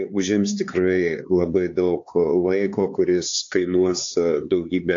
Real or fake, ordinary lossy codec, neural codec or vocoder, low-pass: fake; AAC, 48 kbps; codec, 16 kHz, 4 kbps, X-Codec, HuBERT features, trained on LibriSpeech; 7.2 kHz